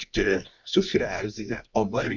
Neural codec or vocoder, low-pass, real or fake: codec, 24 kHz, 0.9 kbps, WavTokenizer, medium music audio release; 7.2 kHz; fake